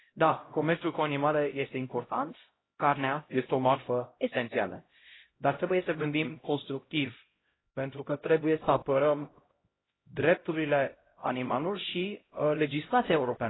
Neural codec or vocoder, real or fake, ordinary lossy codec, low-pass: codec, 16 kHz, 0.5 kbps, X-Codec, HuBERT features, trained on LibriSpeech; fake; AAC, 16 kbps; 7.2 kHz